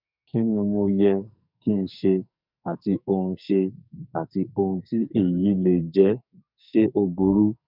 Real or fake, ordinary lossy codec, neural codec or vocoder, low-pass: fake; none; codec, 44.1 kHz, 2.6 kbps, SNAC; 5.4 kHz